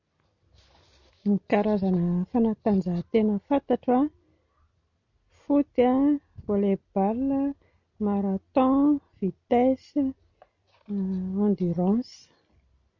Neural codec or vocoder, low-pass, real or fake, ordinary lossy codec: none; 7.2 kHz; real; none